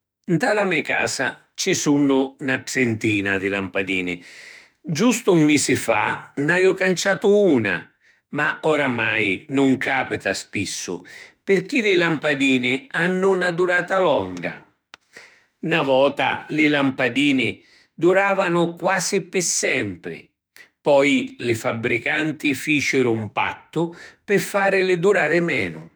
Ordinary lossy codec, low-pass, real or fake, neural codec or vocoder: none; none; fake; autoencoder, 48 kHz, 32 numbers a frame, DAC-VAE, trained on Japanese speech